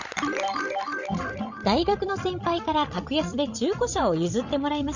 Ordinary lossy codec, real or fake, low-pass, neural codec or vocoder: none; fake; 7.2 kHz; codec, 16 kHz, 16 kbps, FreqCodec, larger model